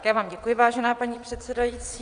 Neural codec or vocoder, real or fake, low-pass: vocoder, 22.05 kHz, 80 mel bands, WaveNeXt; fake; 9.9 kHz